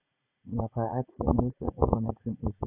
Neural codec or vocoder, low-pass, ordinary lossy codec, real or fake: vocoder, 44.1 kHz, 80 mel bands, Vocos; 3.6 kHz; none; fake